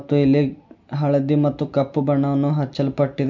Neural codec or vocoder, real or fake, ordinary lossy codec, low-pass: none; real; none; 7.2 kHz